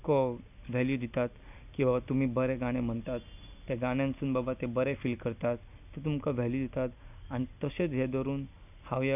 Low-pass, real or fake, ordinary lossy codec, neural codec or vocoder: 3.6 kHz; real; none; none